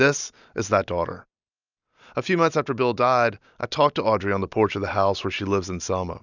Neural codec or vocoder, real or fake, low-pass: none; real; 7.2 kHz